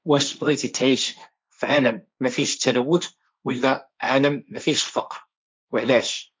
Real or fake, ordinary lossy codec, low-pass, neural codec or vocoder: fake; none; none; codec, 16 kHz, 1.1 kbps, Voila-Tokenizer